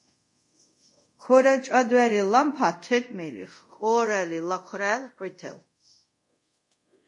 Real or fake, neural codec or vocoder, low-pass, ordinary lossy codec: fake; codec, 24 kHz, 0.5 kbps, DualCodec; 10.8 kHz; MP3, 48 kbps